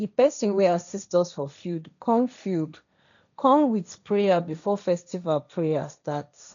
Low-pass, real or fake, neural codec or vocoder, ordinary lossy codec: 7.2 kHz; fake; codec, 16 kHz, 1.1 kbps, Voila-Tokenizer; MP3, 96 kbps